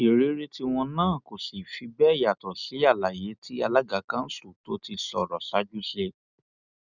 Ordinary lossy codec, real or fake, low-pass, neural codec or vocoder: none; real; 7.2 kHz; none